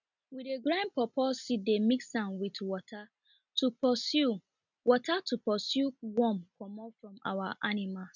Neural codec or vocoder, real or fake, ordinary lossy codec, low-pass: none; real; none; 7.2 kHz